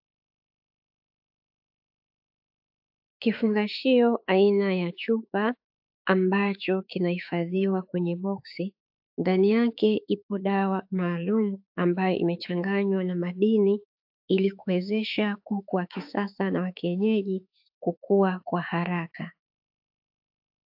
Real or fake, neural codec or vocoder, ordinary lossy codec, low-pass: fake; autoencoder, 48 kHz, 32 numbers a frame, DAC-VAE, trained on Japanese speech; AAC, 48 kbps; 5.4 kHz